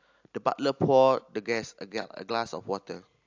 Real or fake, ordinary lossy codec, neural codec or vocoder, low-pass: real; AAC, 48 kbps; none; 7.2 kHz